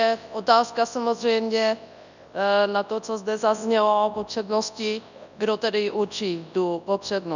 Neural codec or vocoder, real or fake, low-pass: codec, 24 kHz, 0.9 kbps, WavTokenizer, large speech release; fake; 7.2 kHz